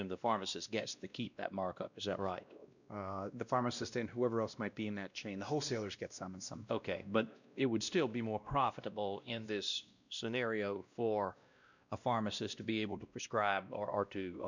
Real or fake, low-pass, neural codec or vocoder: fake; 7.2 kHz; codec, 16 kHz, 1 kbps, X-Codec, WavLM features, trained on Multilingual LibriSpeech